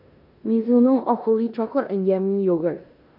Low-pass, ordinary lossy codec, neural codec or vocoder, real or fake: 5.4 kHz; AAC, 48 kbps; codec, 16 kHz in and 24 kHz out, 0.9 kbps, LongCat-Audio-Codec, four codebook decoder; fake